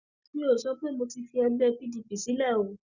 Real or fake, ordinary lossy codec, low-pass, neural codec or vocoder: real; Opus, 64 kbps; 7.2 kHz; none